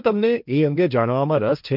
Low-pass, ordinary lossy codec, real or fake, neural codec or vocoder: 5.4 kHz; none; fake; codec, 16 kHz, 1.1 kbps, Voila-Tokenizer